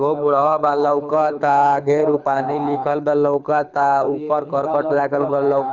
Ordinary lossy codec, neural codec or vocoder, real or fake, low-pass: none; codec, 24 kHz, 6 kbps, HILCodec; fake; 7.2 kHz